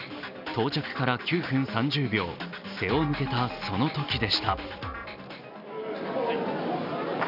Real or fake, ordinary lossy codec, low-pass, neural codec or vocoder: real; none; 5.4 kHz; none